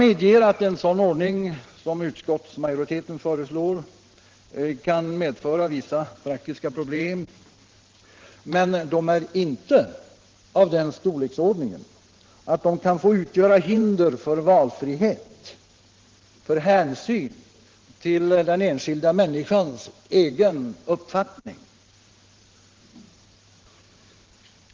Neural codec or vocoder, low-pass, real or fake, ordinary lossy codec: vocoder, 44.1 kHz, 128 mel bands every 512 samples, BigVGAN v2; 7.2 kHz; fake; Opus, 16 kbps